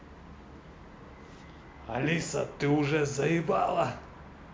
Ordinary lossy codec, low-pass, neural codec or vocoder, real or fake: none; none; none; real